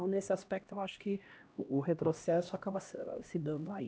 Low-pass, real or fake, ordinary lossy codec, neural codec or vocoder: none; fake; none; codec, 16 kHz, 1 kbps, X-Codec, HuBERT features, trained on LibriSpeech